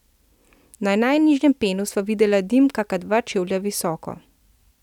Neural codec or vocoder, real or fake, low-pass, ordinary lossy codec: none; real; 19.8 kHz; none